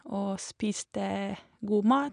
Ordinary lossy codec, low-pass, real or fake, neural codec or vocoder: none; 9.9 kHz; real; none